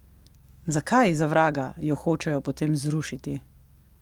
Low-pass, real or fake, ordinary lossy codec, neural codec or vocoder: 19.8 kHz; fake; Opus, 32 kbps; codec, 44.1 kHz, 7.8 kbps, DAC